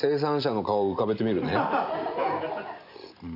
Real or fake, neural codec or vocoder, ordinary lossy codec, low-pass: real; none; none; 5.4 kHz